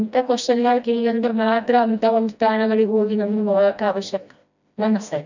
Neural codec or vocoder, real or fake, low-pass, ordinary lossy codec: codec, 16 kHz, 1 kbps, FreqCodec, smaller model; fake; 7.2 kHz; none